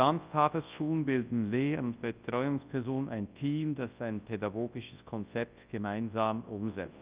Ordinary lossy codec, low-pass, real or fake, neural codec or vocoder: Opus, 32 kbps; 3.6 kHz; fake; codec, 24 kHz, 0.9 kbps, WavTokenizer, large speech release